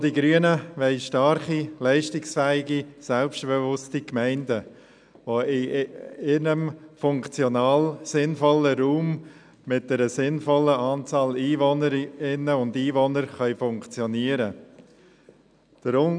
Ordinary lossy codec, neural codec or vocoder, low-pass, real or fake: none; none; 9.9 kHz; real